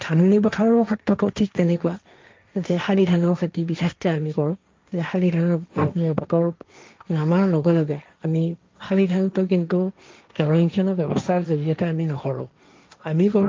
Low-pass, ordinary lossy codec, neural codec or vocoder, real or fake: 7.2 kHz; Opus, 24 kbps; codec, 16 kHz, 1.1 kbps, Voila-Tokenizer; fake